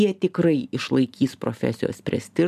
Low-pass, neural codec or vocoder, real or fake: 14.4 kHz; none; real